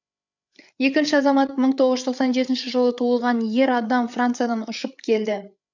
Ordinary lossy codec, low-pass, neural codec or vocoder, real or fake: none; 7.2 kHz; codec, 16 kHz, 8 kbps, FreqCodec, larger model; fake